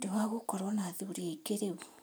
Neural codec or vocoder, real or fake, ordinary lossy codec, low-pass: vocoder, 44.1 kHz, 128 mel bands every 512 samples, BigVGAN v2; fake; none; none